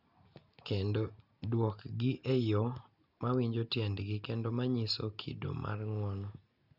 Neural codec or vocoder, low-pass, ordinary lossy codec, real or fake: none; 5.4 kHz; none; real